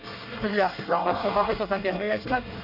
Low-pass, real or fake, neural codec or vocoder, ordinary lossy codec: 5.4 kHz; fake; codec, 24 kHz, 1 kbps, SNAC; none